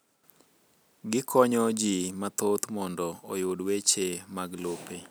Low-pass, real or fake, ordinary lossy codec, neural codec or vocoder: none; real; none; none